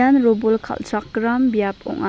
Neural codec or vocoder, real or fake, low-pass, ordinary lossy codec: none; real; none; none